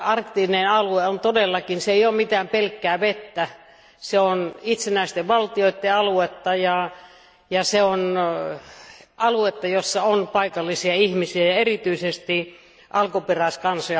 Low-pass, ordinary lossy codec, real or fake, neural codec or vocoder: none; none; real; none